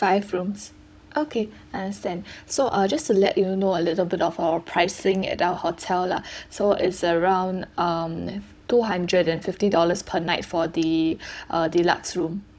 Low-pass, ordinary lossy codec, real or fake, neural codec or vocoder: none; none; fake; codec, 16 kHz, 16 kbps, FunCodec, trained on Chinese and English, 50 frames a second